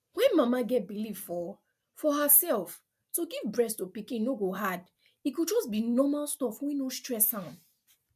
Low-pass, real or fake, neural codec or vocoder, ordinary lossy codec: 14.4 kHz; real; none; MP3, 96 kbps